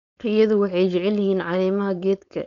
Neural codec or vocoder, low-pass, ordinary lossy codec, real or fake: codec, 16 kHz, 4.8 kbps, FACodec; 7.2 kHz; none; fake